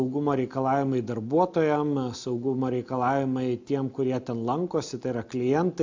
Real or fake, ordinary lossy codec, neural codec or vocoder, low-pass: real; MP3, 64 kbps; none; 7.2 kHz